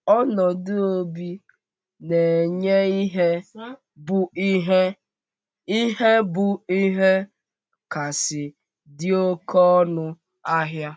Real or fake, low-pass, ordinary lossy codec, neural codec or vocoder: real; none; none; none